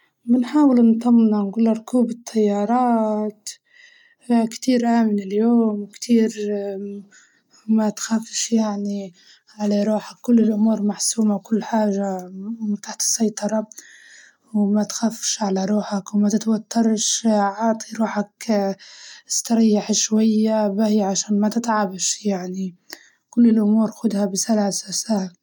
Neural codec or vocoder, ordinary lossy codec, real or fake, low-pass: none; none; real; 19.8 kHz